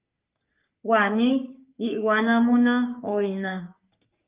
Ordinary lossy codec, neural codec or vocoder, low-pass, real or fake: Opus, 24 kbps; codec, 44.1 kHz, 3.4 kbps, Pupu-Codec; 3.6 kHz; fake